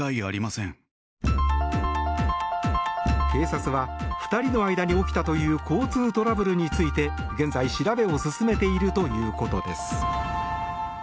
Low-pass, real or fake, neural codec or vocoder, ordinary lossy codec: none; real; none; none